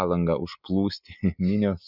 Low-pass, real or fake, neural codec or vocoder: 5.4 kHz; real; none